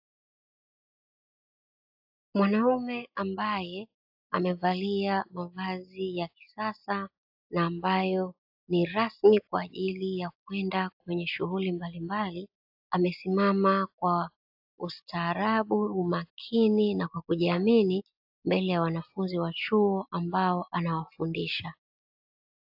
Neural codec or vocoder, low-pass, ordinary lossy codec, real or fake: none; 5.4 kHz; AAC, 48 kbps; real